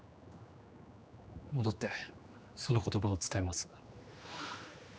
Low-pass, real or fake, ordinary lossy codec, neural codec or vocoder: none; fake; none; codec, 16 kHz, 2 kbps, X-Codec, HuBERT features, trained on general audio